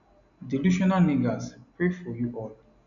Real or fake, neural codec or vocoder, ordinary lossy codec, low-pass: real; none; none; 7.2 kHz